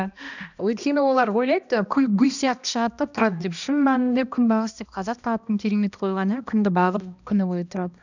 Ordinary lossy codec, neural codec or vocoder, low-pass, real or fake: none; codec, 16 kHz, 1 kbps, X-Codec, HuBERT features, trained on balanced general audio; 7.2 kHz; fake